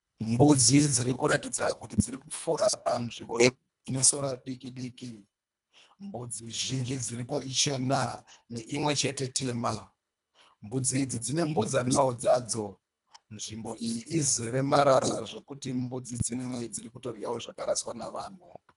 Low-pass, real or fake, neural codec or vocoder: 10.8 kHz; fake; codec, 24 kHz, 1.5 kbps, HILCodec